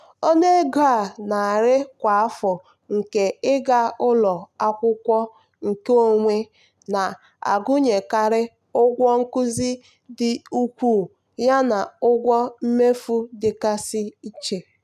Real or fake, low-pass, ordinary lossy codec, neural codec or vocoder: real; 14.4 kHz; none; none